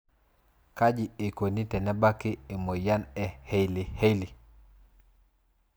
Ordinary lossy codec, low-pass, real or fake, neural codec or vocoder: none; none; real; none